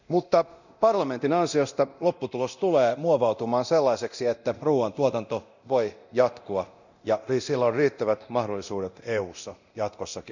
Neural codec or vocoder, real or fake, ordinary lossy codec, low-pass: codec, 24 kHz, 0.9 kbps, DualCodec; fake; none; 7.2 kHz